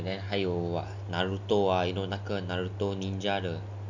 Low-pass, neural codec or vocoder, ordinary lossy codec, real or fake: 7.2 kHz; none; none; real